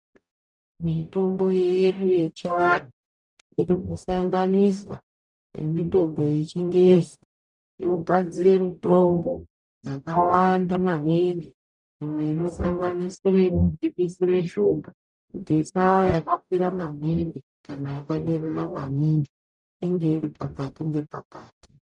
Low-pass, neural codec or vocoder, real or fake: 10.8 kHz; codec, 44.1 kHz, 0.9 kbps, DAC; fake